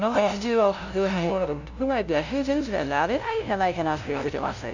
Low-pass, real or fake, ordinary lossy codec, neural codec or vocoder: 7.2 kHz; fake; none; codec, 16 kHz, 0.5 kbps, FunCodec, trained on LibriTTS, 25 frames a second